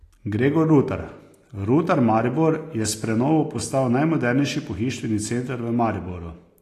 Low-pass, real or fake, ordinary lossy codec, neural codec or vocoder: 14.4 kHz; real; AAC, 48 kbps; none